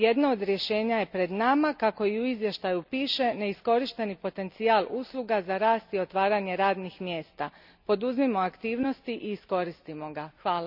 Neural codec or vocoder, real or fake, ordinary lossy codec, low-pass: none; real; none; 5.4 kHz